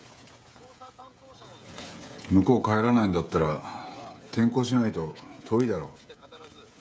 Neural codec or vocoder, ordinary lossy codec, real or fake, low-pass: codec, 16 kHz, 16 kbps, FreqCodec, smaller model; none; fake; none